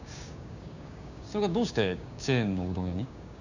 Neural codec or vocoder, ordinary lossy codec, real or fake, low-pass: codec, 16 kHz, 6 kbps, DAC; none; fake; 7.2 kHz